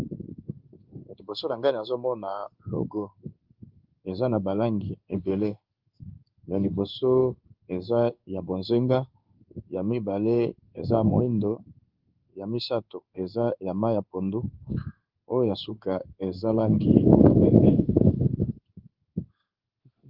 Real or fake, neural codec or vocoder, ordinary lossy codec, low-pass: fake; codec, 16 kHz in and 24 kHz out, 1 kbps, XY-Tokenizer; Opus, 32 kbps; 5.4 kHz